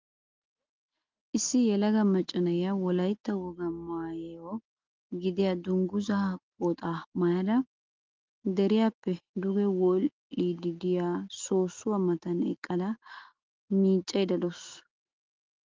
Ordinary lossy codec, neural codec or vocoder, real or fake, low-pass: Opus, 32 kbps; none; real; 7.2 kHz